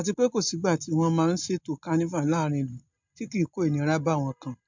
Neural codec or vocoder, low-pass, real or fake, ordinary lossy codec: none; 7.2 kHz; real; MP3, 64 kbps